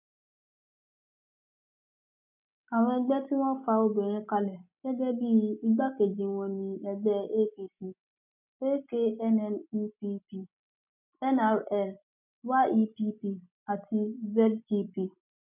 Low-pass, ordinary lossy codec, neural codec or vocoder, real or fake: 3.6 kHz; none; none; real